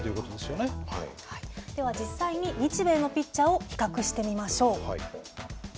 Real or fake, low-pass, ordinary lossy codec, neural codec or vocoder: real; none; none; none